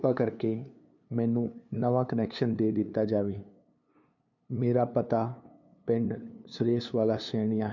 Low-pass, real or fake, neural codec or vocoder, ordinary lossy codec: 7.2 kHz; fake; codec, 16 kHz, 2 kbps, FunCodec, trained on LibriTTS, 25 frames a second; none